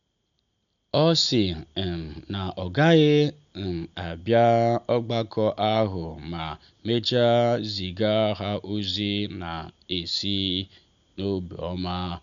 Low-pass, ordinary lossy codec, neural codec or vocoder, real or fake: 7.2 kHz; none; none; real